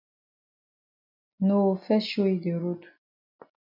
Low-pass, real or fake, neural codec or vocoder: 5.4 kHz; real; none